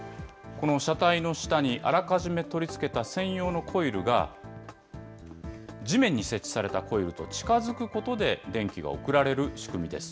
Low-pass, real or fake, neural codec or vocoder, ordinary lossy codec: none; real; none; none